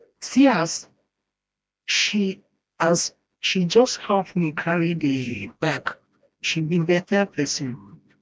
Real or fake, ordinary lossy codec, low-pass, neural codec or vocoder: fake; none; none; codec, 16 kHz, 1 kbps, FreqCodec, smaller model